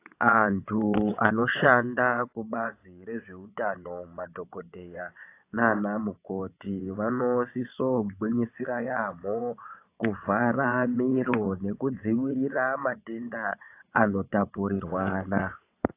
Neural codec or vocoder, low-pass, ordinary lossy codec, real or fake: vocoder, 22.05 kHz, 80 mel bands, WaveNeXt; 3.6 kHz; AAC, 24 kbps; fake